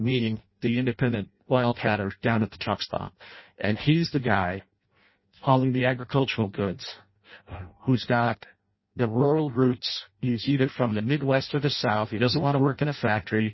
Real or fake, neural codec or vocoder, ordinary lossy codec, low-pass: fake; codec, 16 kHz in and 24 kHz out, 0.6 kbps, FireRedTTS-2 codec; MP3, 24 kbps; 7.2 kHz